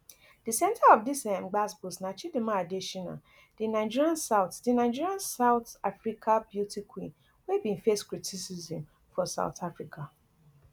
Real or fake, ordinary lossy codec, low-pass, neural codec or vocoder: real; none; none; none